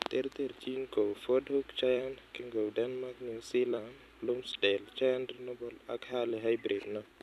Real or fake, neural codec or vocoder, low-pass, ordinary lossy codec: fake; vocoder, 44.1 kHz, 128 mel bands every 256 samples, BigVGAN v2; 14.4 kHz; none